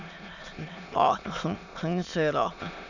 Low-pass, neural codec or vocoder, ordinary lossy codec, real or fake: 7.2 kHz; autoencoder, 22.05 kHz, a latent of 192 numbers a frame, VITS, trained on many speakers; none; fake